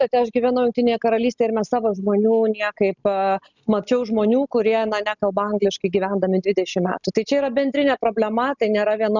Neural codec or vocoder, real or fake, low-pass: none; real; 7.2 kHz